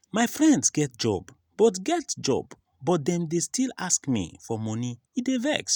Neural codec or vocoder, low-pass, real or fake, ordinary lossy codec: none; none; real; none